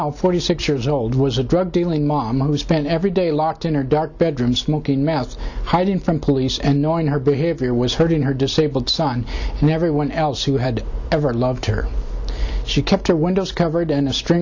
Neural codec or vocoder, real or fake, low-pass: none; real; 7.2 kHz